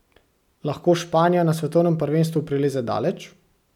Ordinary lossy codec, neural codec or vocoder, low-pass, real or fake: none; none; 19.8 kHz; real